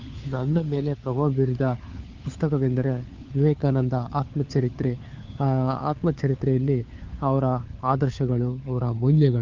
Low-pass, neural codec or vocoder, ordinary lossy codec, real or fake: 7.2 kHz; codec, 16 kHz, 4 kbps, FunCodec, trained on LibriTTS, 50 frames a second; Opus, 32 kbps; fake